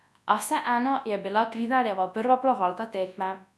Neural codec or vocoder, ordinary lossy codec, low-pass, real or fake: codec, 24 kHz, 0.9 kbps, WavTokenizer, large speech release; none; none; fake